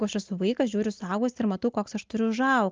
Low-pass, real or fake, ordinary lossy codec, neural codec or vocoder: 7.2 kHz; real; Opus, 24 kbps; none